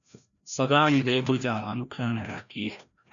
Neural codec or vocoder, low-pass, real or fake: codec, 16 kHz, 1 kbps, FreqCodec, larger model; 7.2 kHz; fake